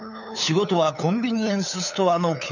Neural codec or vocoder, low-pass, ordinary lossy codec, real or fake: codec, 16 kHz, 8 kbps, FunCodec, trained on LibriTTS, 25 frames a second; 7.2 kHz; none; fake